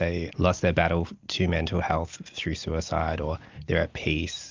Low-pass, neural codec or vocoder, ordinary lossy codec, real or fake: 7.2 kHz; none; Opus, 24 kbps; real